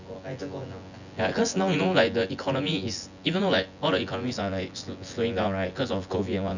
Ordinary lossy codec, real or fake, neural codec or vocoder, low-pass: none; fake; vocoder, 24 kHz, 100 mel bands, Vocos; 7.2 kHz